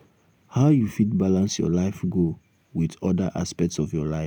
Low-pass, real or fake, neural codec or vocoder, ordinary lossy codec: none; real; none; none